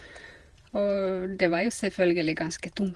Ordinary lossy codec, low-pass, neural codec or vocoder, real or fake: Opus, 24 kbps; 10.8 kHz; vocoder, 48 kHz, 128 mel bands, Vocos; fake